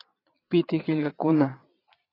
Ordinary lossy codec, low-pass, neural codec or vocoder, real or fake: AAC, 24 kbps; 5.4 kHz; vocoder, 22.05 kHz, 80 mel bands, Vocos; fake